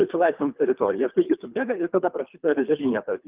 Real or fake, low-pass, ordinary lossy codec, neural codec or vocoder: fake; 3.6 kHz; Opus, 32 kbps; codec, 24 kHz, 3 kbps, HILCodec